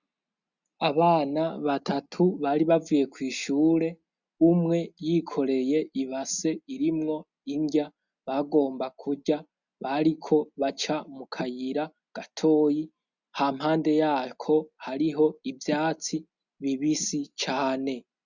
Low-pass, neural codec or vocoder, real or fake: 7.2 kHz; none; real